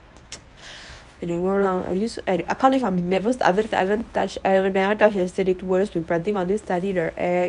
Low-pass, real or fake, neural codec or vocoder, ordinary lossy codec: 10.8 kHz; fake; codec, 24 kHz, 0.9 kbps, WavTokenizer, medium speech release version 1; none